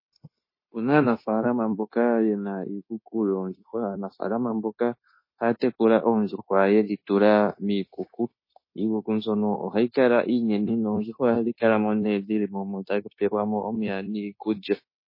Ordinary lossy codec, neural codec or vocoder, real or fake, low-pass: MP3, 24 kbps; codec, 16 kHz, 0.9 kbps, LongCat-Audio-Codec; fake; 5.4 kHz